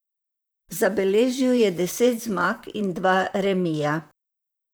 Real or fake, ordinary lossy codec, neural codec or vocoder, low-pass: fake; none; vocoder, 44.1 kHz, 128 mel bands, Pupu-Vocoder; none